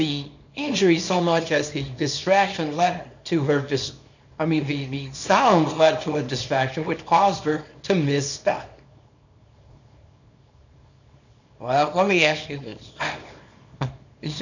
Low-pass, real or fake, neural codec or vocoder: 7.2 kHz; fake; codec, 24 kHz, 0.9 kbps, WavTokenizer, small release